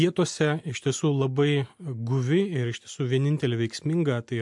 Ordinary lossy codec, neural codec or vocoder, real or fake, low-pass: MP3, 64 kbps; none; real; 10.8 kHz